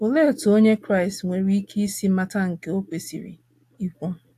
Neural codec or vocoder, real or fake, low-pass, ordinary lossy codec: none; real; 14.4 kHz; AAC, 64 kbps